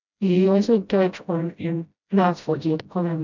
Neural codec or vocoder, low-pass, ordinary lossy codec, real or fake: codec, 16 kHz, 0.5 kbps, FreqCodec, smaller model; 7.2 kHz; none; fake